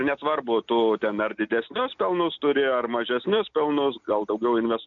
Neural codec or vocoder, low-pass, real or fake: none; 7.2 kHz; real